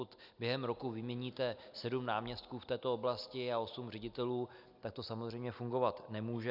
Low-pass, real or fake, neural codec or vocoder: 5.4 kHz; real; none